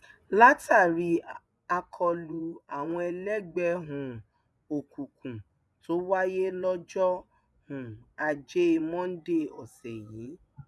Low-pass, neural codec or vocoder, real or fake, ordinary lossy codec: none; vocoder, 24 kHz, 100 mel bands, Vocos; fake; none